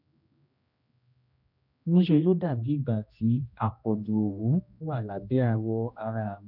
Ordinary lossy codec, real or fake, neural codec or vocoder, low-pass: none; fake; codec, 16 kHz, 1 kbps, X-Codec, HuBERT features, trained on general audio; 5.4 kHz